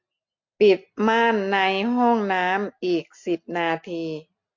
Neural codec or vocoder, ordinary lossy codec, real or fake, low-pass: none; MP3, 48 kbps; real; 7.2 kHz